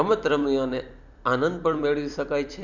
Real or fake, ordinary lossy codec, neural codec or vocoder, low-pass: fake; none; vocoder, 44.1 kHz, 128 mel bands every 256 samples, BigVGAN v2; 7.2 kHz